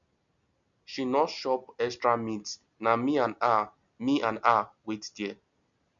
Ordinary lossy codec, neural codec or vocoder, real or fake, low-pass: none; none; real; 7.2 kHz